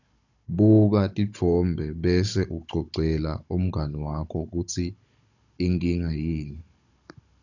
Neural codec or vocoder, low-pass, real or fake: codec, 16 kHz, 16 kbps, FunCodec, trained on Chinese and English, 50 frames a second; 7.2 kHz; fake